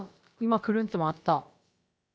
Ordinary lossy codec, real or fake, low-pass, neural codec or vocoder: none; fake; none; codec, 16 kHz, about 1 kbps, DyCAST, with the encoder's durations